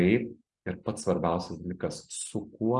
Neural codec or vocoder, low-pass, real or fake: none; 10.8 kHz; real